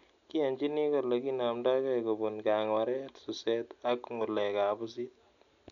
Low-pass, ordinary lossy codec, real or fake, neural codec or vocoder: 7.2 kHz; none; real; none